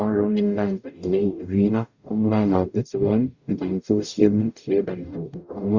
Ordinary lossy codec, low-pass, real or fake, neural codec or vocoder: Opus, 64 kbps; 7.2 kHz; fake; codec, 44.1 kHz, 0.9 kbps, DAC